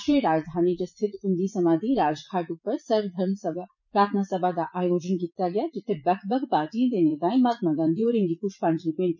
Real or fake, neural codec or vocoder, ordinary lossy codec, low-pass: fake; vocoder, 44.1 kHz, 80 mel bands, Vocos; none; 7.2 kHz